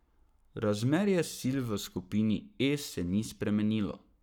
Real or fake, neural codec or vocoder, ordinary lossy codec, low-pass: fake; codec, 44.1 kHz, 7.8 kbps, Pupu-Codec; none; 19.8 kHz